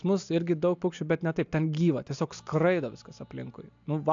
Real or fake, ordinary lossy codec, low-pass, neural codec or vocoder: real; AAC, 64 kbps; 7.2 kHz; none